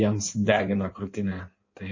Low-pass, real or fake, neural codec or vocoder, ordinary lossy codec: 7.2 kHz; fake; codec, 16 kHz in and 24 kHz out, 1.1 kbps, FireRedTTS-2 codec; MP3, 32 kbps